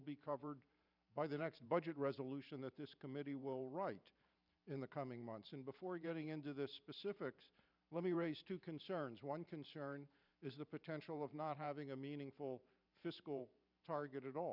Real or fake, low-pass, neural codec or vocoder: fake; 5.4 kHz; vocoder, 44.1 kHz, 128 mel bands every 512 samples, BigVGAN v2